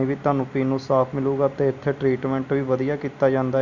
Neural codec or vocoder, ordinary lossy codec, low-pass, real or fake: none; none; 7.2 kHz; real